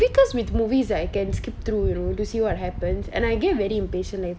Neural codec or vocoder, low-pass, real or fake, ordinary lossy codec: none; none; real; none